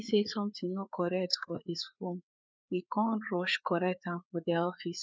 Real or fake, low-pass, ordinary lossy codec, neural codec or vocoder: fake; none; none; codec, 16 kHz, 4 kbps, FreqCodec, larger model